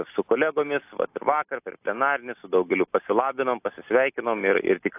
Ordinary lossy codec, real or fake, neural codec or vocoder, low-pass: AAC, 32 kbps; real; none; 3.6 kHz